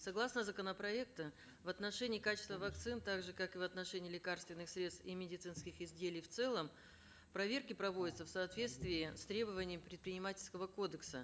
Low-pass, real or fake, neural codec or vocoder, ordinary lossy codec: none; real; none; none